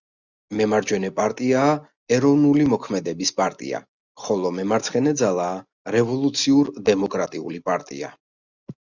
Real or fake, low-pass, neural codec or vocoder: real; 7.2 kHz; none